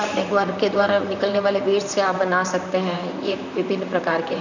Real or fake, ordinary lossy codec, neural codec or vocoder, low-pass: fake; none; vocoder, 44.1 kHz, 128 mel bands, Pupu-Vocoder; 7.2 kHz